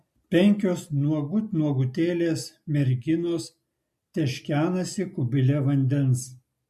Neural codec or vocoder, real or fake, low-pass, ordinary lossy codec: none; real; 14.4 kHz; MP3, 64 kbps